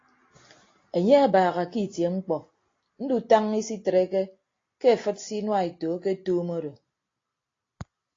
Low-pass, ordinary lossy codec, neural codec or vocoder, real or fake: 7.2 kHz; AAC, 32 kbps; none; real